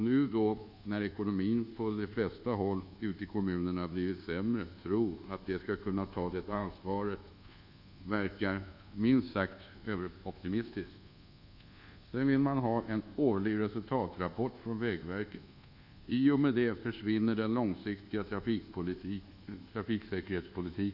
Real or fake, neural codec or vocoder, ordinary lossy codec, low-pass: fake; codec, 24 kHz, 1.2 kbps, DualCodec; none; 5.4 kHz